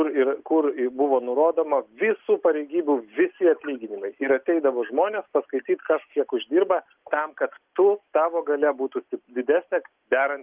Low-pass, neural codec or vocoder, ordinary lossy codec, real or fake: 3.6 kHz; none; Opus, 32 kbps; real